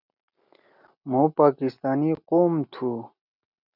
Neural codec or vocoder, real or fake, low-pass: none; real; 5.4 kHz